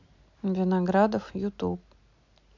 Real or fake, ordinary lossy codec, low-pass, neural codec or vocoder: real; MP3, 64 kbps; 7.2 kHz; none